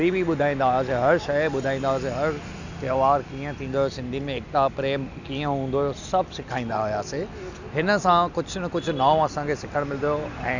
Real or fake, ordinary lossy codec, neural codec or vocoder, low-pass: real; none; none; 7.2 kHz